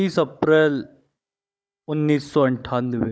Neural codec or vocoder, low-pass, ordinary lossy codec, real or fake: codec, 16 kHz, 16 kbps, FunCodec, trained on Chinese and English, 50 frames a second; none; none; fake